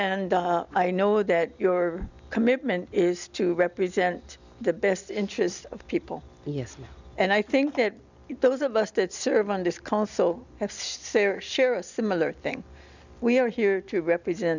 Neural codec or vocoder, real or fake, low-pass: none; real; 7.2 kHz